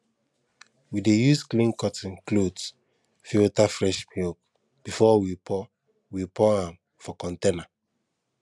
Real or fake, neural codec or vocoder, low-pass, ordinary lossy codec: real; none; none; none